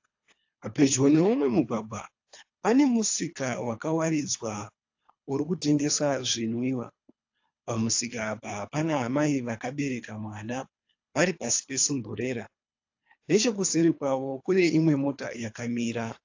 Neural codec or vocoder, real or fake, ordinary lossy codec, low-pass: codec, 24 kHz, 3 kbps, HILCodec; fake; AAC, 48 kbps; 7.2 kHz